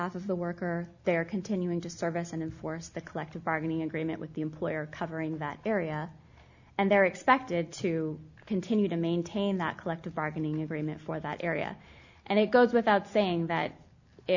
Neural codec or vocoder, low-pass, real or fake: none; 7.2 kHz; real